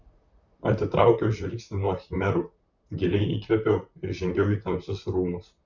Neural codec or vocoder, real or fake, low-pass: vocoder, 44.1 kHz, 128 mel bands, Pupu-Vocoder; fake; 7.2 kHz